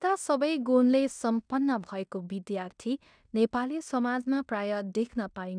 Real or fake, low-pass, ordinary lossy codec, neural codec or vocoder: fake; 9.9 kHz; none; codec, 16 kHz in and 24 kHz out, 0.9 kbps, LongCat-Audio-Codec, fine tuned four codebook decoder